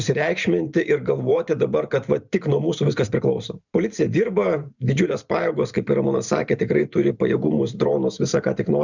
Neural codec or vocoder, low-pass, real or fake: vocoder, 44.1 kHz, 128 mel bands every 512 samples, BigVGAN v2; 7.2 kHz; fake